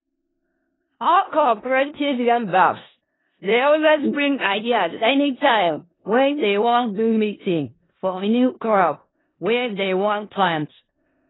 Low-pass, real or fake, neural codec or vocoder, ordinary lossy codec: 7.2 kHz; fake; codec, 16 kHz in and 24 kHz out, 0.4 kbps, LongCat-Audio-Codec, four codebook decoder; AAC, 16 kbps